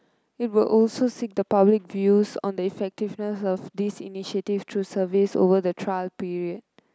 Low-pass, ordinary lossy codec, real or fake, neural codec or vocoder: none; none; real; none